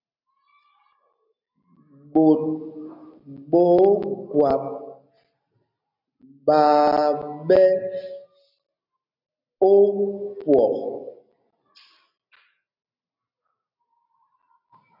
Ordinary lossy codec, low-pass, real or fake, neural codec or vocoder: MP3, 48 kbps; 5.4 kHz; real; none